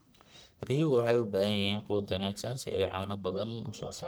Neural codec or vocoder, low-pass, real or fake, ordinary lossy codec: codec, 44.1 kHz, 1.7 kbps, Pupu-Codec; none; fake; none